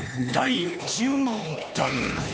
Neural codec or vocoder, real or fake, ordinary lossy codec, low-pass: codec, 16 kHz, 2 kbps, X-Codec, HuBERT features, trained on LibriSpeech; fake; none; none